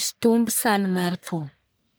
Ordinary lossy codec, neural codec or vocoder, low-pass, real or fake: none; codec, 44.1 kHz, 1.7 kbps, Pupu-Codec; none; fake